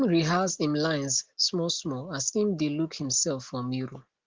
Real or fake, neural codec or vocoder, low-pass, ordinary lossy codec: real; none; 7.2 kHz; Opus, 16 kbps